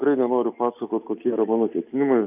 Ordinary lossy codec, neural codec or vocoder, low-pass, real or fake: AAC, 32 kbps; none; 3.6 kHz; real